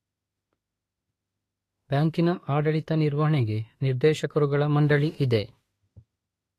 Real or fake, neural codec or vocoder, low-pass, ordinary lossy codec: fake; autoencoder, 48 kHz, 32 numbers a frame, DAC-VAE, trained on Japanese speech; 14.4 kHz; AAC, 48 kbps